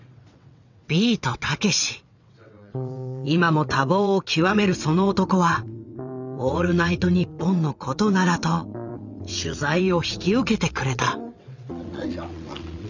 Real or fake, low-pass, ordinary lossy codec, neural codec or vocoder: fake; 7.2 kHz; none; vocoder, 22.05 kHz, 80 mel bands, WaveNeXt